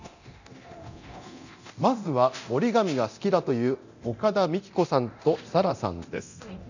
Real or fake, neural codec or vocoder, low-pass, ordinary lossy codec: fake; codec, 24 kHz, 0.9 kbps, DualCodec; 7.2 kHz; none